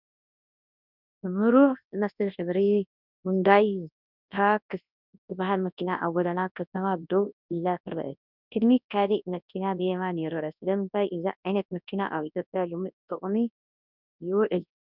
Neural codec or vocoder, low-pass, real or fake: codec, 24 kHz, 0.9 kbps, WavTokenizer, large speech release; 5.4 kHz; fake